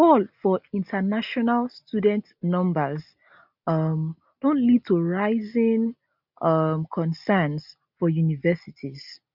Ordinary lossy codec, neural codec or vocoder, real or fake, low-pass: none; none; real; 5.4 kHz